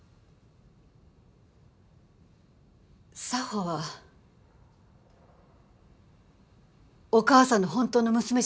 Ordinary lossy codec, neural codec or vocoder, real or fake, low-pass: none; none; real; none